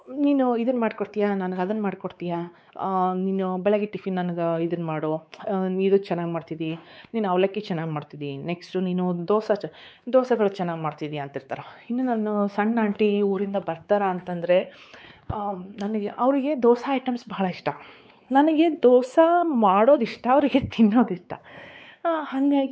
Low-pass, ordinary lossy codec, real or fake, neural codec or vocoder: none; none; fake; codec, 16 kHz, 4 kbps, X-Codec, WavLM features, trained on Multilingual LibriSpeech